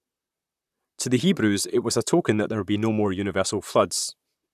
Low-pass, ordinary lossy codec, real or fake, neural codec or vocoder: 14.4 kHz; none; fake; vocoder, 44.1 kHz, 128 mel bands, Pupu-Vocoder